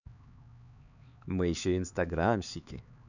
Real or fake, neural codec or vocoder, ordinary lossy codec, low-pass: fake; codec, 16 kHz, 4 kbps, X-Codec, HuBERT features, trained on LibriSpeech; none; 7.2 kHz